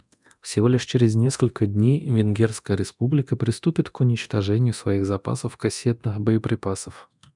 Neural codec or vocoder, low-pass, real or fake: codec, 24 kHz, 0.9 kbps, DualCodec; 10.8 kHz; fake